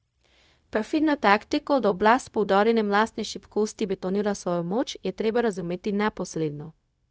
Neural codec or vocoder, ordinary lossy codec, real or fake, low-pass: codec, 16 kHz, 0.4 kbps, LongCat-Audio-Codec; none; fake; none